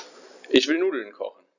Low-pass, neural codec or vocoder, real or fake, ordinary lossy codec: 7.2 kHz; none; real; none